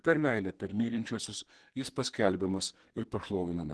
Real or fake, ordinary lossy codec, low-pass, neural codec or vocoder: fake; Opus, 16 kbps; 10.8 kHz; codec, 44.1 kHz, 2.6 kbps, SNAC